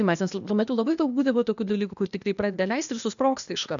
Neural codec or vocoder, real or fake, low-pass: codec, 16 kHz, 0.8 kbps, ZipCodec; fake; 7.2 kHz